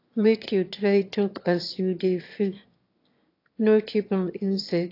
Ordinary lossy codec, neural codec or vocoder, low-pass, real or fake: AAC, 32 kbps; autoencoder, 22.05 kHz, a latent of 192 numbers a frame, VITS, trained on one speaker; 5.4 kHz; fake